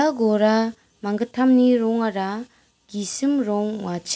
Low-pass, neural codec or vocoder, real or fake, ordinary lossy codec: none; none; real; none